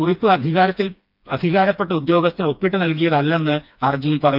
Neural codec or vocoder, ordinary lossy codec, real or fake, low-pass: codec, 16 kHz, 2 kbps, FreqCodec, smaller model; none; fake; 5.4 kHz